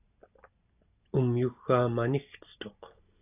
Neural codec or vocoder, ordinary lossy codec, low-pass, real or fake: none; AAC, 32 kbps; 3.6 kHz; real